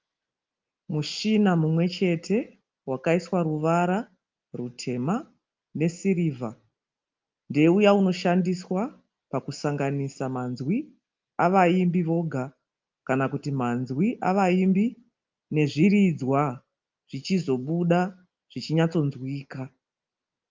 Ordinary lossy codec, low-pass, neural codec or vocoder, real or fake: Opus, 24 kbps; 7.2 kHz; none; real